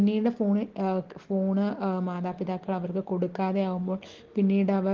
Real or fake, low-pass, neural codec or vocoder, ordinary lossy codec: real; 7.2 kHz; none; Opus, 16 kbps